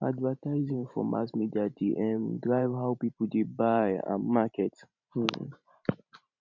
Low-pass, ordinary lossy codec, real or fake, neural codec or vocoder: 7.2 kHz; none; fake; vocoder, 44.1 kHz, 128 mel bands every 512 samples, BigVGAN v2